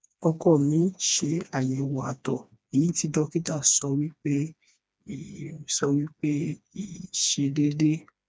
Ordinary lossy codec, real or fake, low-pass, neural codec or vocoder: none; fake; none; codec, 16 kHz, 2 kbps, FreqCodec, smaller model